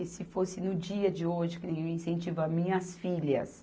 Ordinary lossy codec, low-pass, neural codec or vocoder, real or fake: none; none; none; real